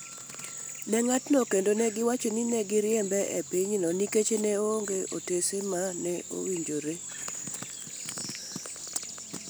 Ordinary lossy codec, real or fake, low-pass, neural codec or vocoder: none; real; none; none